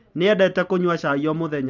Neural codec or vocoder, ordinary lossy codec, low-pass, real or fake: none; none; 7.2 kHz; real